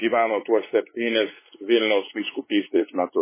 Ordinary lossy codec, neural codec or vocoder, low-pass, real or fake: MP3, 16 kbps; codec, 16 kHz, 4 kbps, X-Codec, WavLM features, trained on Multilingual LibriSpeech; 3.6 kHz; fake